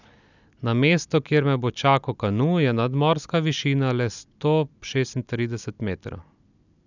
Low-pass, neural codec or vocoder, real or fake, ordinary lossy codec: 7.2 kHz; none; real; none